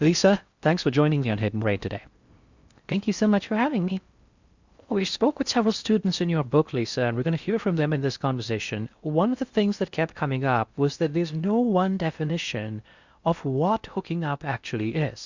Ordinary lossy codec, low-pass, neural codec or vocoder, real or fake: Opus, 64 kbps; 7.2 kHz; codec, 16 kHz in and 24 kHz out, 0.6 kbps, FocalCodec, streaming, 4096 codes; fake